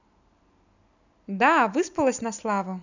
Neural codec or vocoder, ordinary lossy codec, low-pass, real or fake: none; none; 7.2 kHz; real